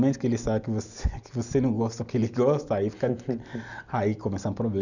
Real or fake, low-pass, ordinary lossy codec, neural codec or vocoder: real; 7.2 kHz; none; none